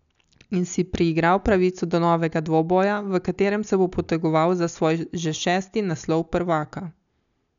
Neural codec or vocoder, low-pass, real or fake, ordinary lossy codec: none; 7.2 kHz; real; none